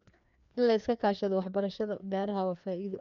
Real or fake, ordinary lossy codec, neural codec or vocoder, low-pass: fake; none; codec, 16 kHz, 2 kbps, FreqCodec, larger model; 7.2 kHz